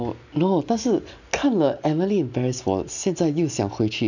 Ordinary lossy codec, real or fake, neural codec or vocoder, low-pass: none; real; none; 7.2 kHz